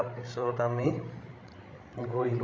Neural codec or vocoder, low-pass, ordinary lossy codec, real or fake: codec, 16 kHz, 16 kbps, FreqCodec, larger model; none; none; fake